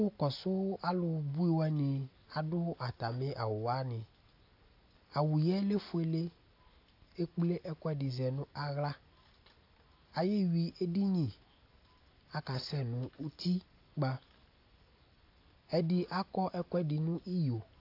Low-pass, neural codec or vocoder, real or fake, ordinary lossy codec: 5.4 kHz; none; real; AAC, 32 kbps